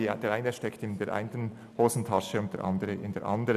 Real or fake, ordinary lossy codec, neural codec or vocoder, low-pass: real; none; none; 14.4 kHz